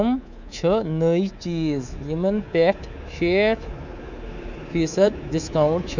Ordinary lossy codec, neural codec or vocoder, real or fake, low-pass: none; codec, 24 kHz, 3.1 kbps, DualCodec; fake; 7.2 kHz